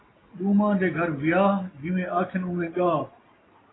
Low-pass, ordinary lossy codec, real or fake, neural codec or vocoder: 7.2 kHz; AAC, 16 kbps; real; none